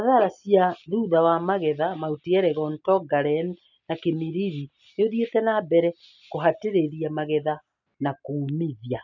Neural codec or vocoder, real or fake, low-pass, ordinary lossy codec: none; real; 7.2 kHz; none